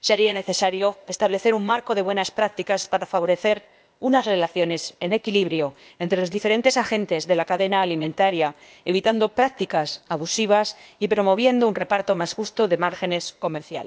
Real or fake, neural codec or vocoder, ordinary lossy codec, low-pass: fake; codec, 16 kHz, 0.8 kbps, ZipCodec; none; none